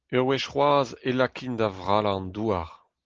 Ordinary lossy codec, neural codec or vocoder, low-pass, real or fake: Opus, 16 kbps; none; 7.2 kHz; real